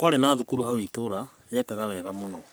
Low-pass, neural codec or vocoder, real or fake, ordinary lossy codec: none; codec, 44.1 kHz, 3.4 kbps, Pupu-Codec; fake; none